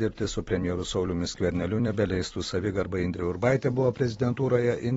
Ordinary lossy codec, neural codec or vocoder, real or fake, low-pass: AAC, 24 kbps; vocoder, 44.1 kHz, 128 mel bands every 256 samples, BigVGAN v2; fake; 19.8 kHz